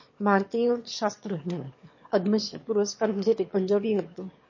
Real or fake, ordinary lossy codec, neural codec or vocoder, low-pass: fake; MP3, 32 kbps; autoencoder, 22.05 kHz, a latent of 192 numbers a frame, VITS, trained on one speaker; 7.2 kHz